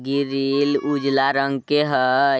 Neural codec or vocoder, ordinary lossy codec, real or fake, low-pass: none; none; real; none